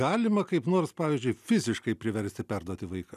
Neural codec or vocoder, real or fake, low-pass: none; real; 14.4 kHz